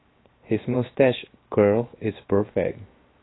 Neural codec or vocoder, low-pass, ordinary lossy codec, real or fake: codec, 16 kHz, 0.7 kbps, FocalCodec; 7.2 kHz; AAC, 16 kbps; fake